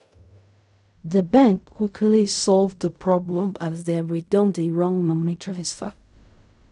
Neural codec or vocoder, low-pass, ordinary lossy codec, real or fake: codec, 16 kHz in and 24 kHz out, 0.4 kbps, LongCat-Audio-Codec, fine tuned four codebook decoder; 10.8 kHz; none; fake